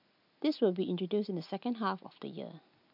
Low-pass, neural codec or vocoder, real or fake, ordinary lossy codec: 5.4 kHz; none; real; none